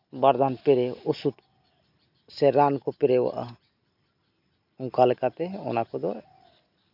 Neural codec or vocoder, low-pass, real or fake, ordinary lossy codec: none; 5.4 kHz; real; none